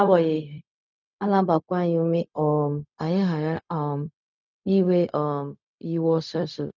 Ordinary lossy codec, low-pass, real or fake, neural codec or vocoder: none; 7.2 kHz; fake; codec, 16 kHz, 0.4 kbps, LongCat-Audio-Codec